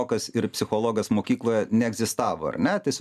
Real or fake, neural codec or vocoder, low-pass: real; none; 14.4 kHz